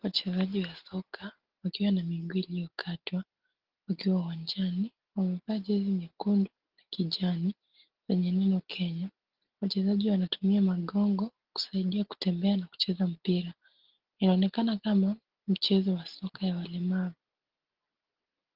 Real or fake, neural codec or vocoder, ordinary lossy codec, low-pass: real; none; Opus, 16 kbps; 5.4 kHz